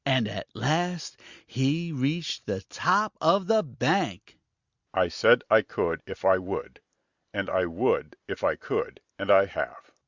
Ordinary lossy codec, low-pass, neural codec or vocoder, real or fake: Opus, 64 kbps; 7.2 kHz; none; real